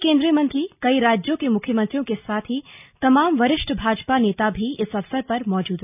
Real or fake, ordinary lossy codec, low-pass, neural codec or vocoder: real; none; 3.6 kHz; none